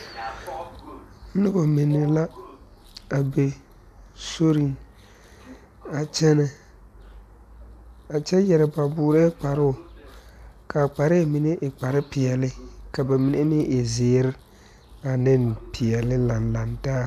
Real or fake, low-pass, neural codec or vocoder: real; 14.4 kHz; none